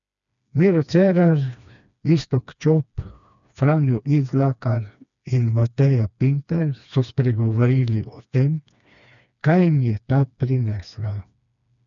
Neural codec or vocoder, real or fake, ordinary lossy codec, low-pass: codec, 16 kHz, 2 kbps, FreqCodec, smaller model; fake; none; 7.2 kHz